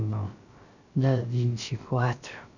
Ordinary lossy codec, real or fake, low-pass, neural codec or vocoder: none; fake; 7.2 kHz; codec, 16 kHz, 0.3 kbps, FocalCodec